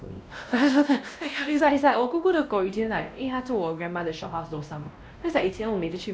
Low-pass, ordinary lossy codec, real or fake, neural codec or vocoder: none; none; fake; codec, 16 kHz, 1 kbps, X-Codec, WavLM features, trained on Multilingual LibriSpeech